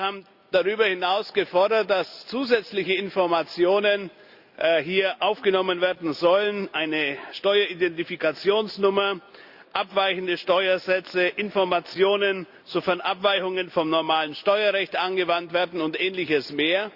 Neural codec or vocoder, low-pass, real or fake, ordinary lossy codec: none; 5.4 kHz; real; Opus, 64 kbps